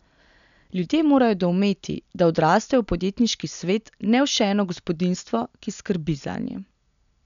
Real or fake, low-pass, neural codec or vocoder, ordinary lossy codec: real; 7.2 kHz; none; none